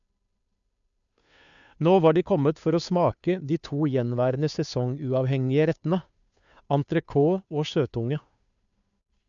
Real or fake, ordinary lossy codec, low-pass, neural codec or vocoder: fake; none; 7.2 kHz; codec, 16 kHz, 2 kbps, FunCodec, trained on Chinese and English, 25 frames a second